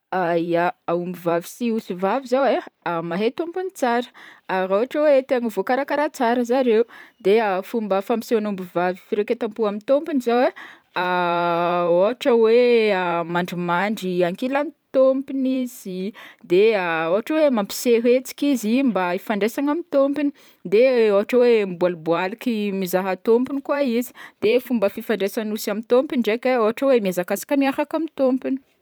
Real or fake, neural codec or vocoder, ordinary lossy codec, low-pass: fake; vocoder, 44.1 kHz, 128 mel bands every 512 samples, BigVGAN v2; none; none